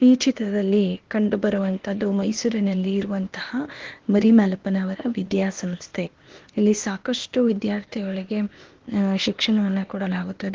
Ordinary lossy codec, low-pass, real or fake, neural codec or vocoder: Opus, 16 kbps; 7.2 kHz; fake; codec, 16 kHz, 0.8 kbps, ZipCodec